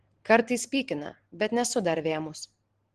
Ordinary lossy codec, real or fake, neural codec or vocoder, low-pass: Opus, 16 kbps; real; none; 9.9 kHz